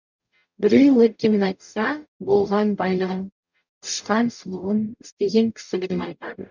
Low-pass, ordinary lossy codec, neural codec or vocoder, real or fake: 7.2 kHz; none; codec, 44.1 kHz, 0.9 kbps, DAC; fake